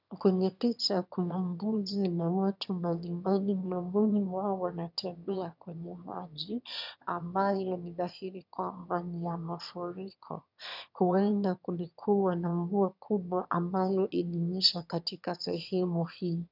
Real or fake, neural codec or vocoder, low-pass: fake; autoencoder, 22.05 kHz, a latent of 192 numbers a frame, VITS, trained on one speaker; 5.4 kHz